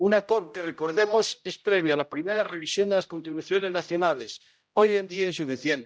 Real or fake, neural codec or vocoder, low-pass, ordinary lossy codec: fake; codec, 16 kHz, 0.5 kbps, X-Codec, HuBERT features, trained on general audio; none; none